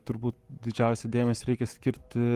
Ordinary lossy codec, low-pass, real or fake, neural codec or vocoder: Opus, 24 kbps; 14.4 kHz; fake; codec, 44.1 kHz, 7.8 kbps, Pupu-Codec